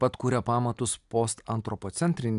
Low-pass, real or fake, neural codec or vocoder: 10.8 kHz; real; none